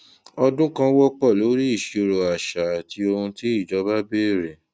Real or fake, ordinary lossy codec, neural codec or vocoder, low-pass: real; none; none; none